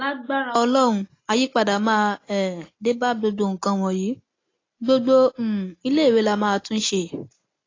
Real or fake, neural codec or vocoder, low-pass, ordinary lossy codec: real; none; 7.2 kHz; AAC, 32 kbps